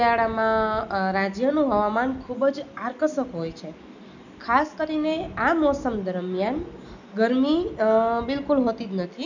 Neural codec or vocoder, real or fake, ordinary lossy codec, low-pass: none; real; none; 7.2 kHz